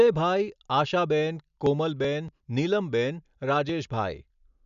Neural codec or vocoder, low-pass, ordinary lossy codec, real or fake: none; 7.2 kHz; none; real